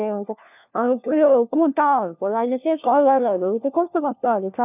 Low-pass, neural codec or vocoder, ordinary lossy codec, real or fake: 3.6 kHz; codec, 16 kHz, 1 kbps, FunCodec, trained on LibriTTS, 50 frames a second; none; fake